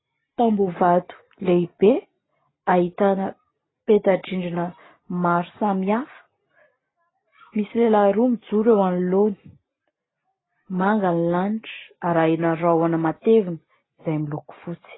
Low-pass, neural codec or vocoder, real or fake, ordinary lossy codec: 7.2 kHz; none; real; AAC, 16 kbps